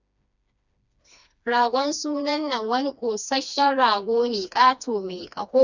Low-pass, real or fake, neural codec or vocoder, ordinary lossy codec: 7.2 kHz; fake; codec, 16 kHz, 2 kbps, FreqCodec, smaller model; none